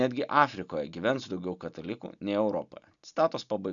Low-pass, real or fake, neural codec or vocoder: 7.2 kHz; real; none